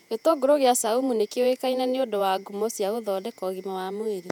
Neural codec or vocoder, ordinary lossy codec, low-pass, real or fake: vocoder, 48 kHz, 128 mel bands, Vocos; none; 19.8 kHz; fake